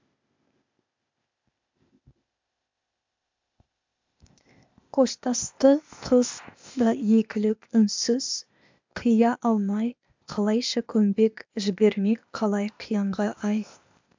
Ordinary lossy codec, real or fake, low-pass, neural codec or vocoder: MP3, 64 kbps; fake; 7.2 kHz; codec, 16 kHz, 0.8 kbps, ZipCodec